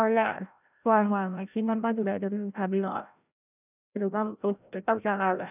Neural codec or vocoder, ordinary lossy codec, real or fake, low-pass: codec, 16 kHz, 0.5 kbps, FreqCodec, larger model; none; fake; 3.6 kHz